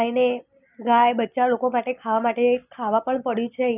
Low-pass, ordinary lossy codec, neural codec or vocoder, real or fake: 3.6 kHz; none; none; real